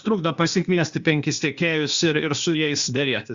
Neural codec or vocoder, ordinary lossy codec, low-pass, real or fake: codec, 16 kHz, 0.8 kbps, ZipCodec; Opus, 64 kbps; 7.2 kHz; fake